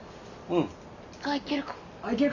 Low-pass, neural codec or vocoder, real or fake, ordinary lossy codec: 7.2 kHz; none; real; none